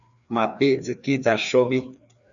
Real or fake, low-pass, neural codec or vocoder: fake; 7.2 kHz; codec, 16 kHz, 2 kbps, FreqCodec, larger model